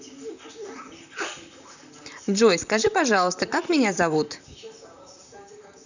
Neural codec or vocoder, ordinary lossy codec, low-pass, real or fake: vocoder, 44.1 kHz, 128 mel bands, Pupu-Vocoder; none; 7.2 kHz; fake